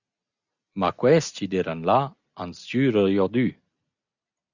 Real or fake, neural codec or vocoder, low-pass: real; none; 7.2 kHz